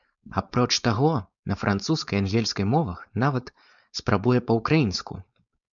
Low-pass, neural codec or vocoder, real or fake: 7.2 kHz; codec, 16 kHz, 4.8 kbps, FACodec; fake